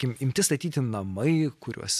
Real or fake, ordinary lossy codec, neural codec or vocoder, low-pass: real; AAC, 96 kbps; none; 14.4 kHz